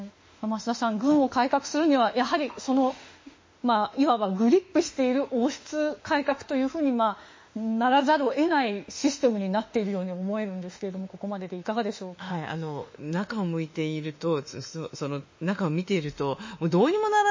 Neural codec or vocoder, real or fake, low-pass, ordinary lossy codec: autoencoder, 48 kHz, 32 numbers a frame, DAC-VAE, trained on Japanese speech; fake; 7.2 kHz; MP3, 32 kbps